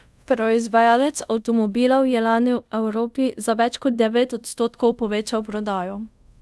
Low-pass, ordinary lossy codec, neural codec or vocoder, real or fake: none; none; codec, 24 kHz, 0.5 kbps, DualCodec; fake